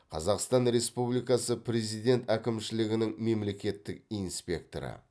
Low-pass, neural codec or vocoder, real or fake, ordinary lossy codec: none; none; real; none